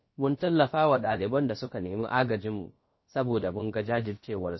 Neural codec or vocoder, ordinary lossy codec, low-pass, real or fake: codec, 16 kHz, about 1 kbps, DyCAST, with the encoder's durations; MP3, 24 kbps; 7.2 kHz; fake